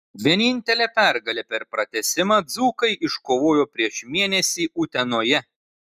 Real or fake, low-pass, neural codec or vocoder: real; 14.4 kHz; none